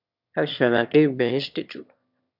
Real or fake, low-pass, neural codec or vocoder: fake; 5.4 kHz; autoencoder, 22.05 kHz, a latent of 192 numbers a frame, VITS, trained on one speaker